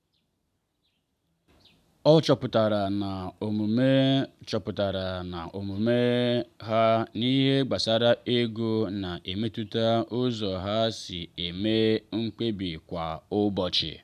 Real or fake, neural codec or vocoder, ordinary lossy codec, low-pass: real; none; none; 14.4 kHz